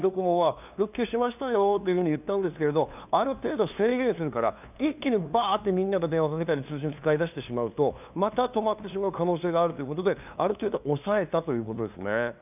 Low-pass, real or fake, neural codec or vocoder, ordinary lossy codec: 3.6 kHz; fake; codec, 16 kHz, 2 kbps, FunCodec, trained on LibriTTS, 25 frames a second; none